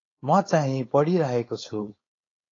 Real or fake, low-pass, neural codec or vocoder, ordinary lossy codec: fake; 7.2 kHz; codec, 16 kHz, 4.8 kbps, FACodec; AAC, 32 kbps